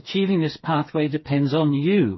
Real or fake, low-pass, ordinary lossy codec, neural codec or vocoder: fake; 7.2 kHz; MP3, 24 kbps; codec, 16 kHz, 4 kbps, FreqCodec, smaller model